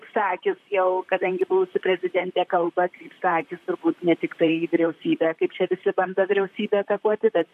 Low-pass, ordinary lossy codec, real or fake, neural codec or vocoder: 14.4 kHz; MP3, 64 kbps; fake; vocoder, 44.1 kHz, 128 mel bands, Pupu-Vocoder